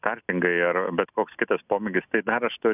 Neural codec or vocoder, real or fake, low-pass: none; real; 3.6 kHz